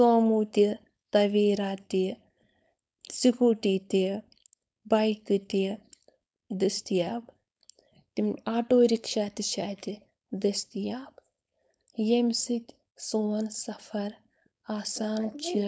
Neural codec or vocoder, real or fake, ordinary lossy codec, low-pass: codec, 16 kHz, 4.8 kbps, FACodec; fake; none; none